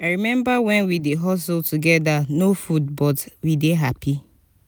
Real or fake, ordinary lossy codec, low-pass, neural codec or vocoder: real; none; none; none